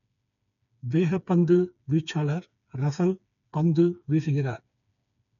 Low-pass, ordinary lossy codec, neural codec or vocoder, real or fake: 7.2 kHz; none; codec, 16 kHz, 4 kbps, FreqCodec, smaller model; fake